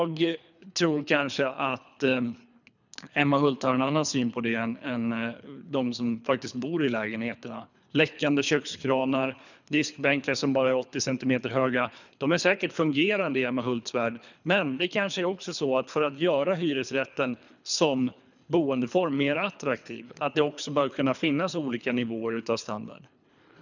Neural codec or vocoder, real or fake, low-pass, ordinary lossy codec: codec, 24 kHz, 3 kbps, HILCodec; fake; 7.2 kHz; none